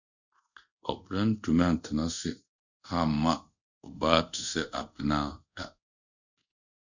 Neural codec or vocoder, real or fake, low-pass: codec, 24 kHz, 0.5 kbps, DualCodec; fake; 7.2 kHz